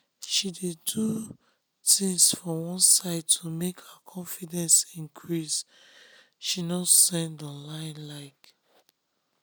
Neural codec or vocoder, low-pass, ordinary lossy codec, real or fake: none; none; none; real